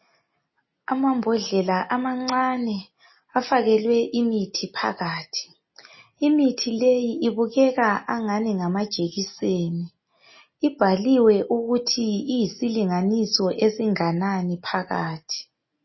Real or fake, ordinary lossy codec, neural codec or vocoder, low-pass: real; MP3, 24 kbps; none; 7.2 kHz